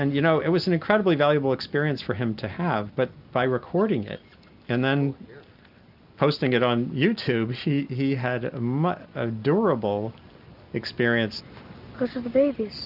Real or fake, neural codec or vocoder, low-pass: real; none; 5.4 kHz